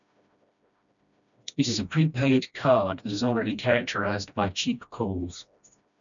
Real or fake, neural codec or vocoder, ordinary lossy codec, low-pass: fake; codec, 16 kHz, 1 kbps, FreqCodec, smaller model; MP3, 96 kbps; 7.2 kHz